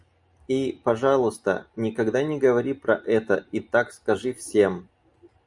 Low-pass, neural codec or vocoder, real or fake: 10.8 kHz; none; real